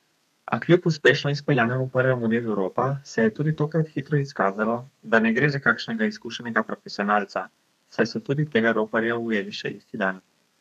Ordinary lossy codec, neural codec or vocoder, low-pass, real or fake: none; codec, 32 kHz, 1.9 kbps, SNAC; 14.4 kHz; fake